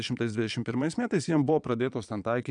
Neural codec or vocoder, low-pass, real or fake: vocoder, 22.05 kHz, 80 mel bands, Vocos; 9.9 kHz; fake